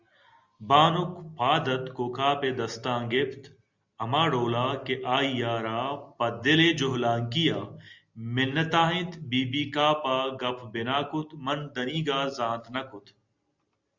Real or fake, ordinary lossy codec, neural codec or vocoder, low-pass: real; Opus, 64 kbps; none; 7.2 kHz